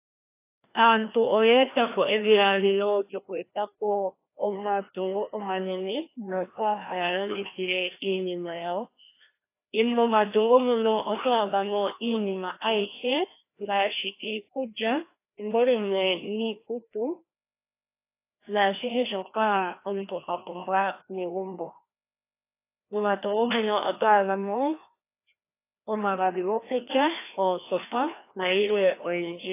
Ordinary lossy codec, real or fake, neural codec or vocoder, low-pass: AAC, 24 kbps; fake; codec, 16 kHz, 1 kbps, FreqCodec, larger model; 3.6 kHz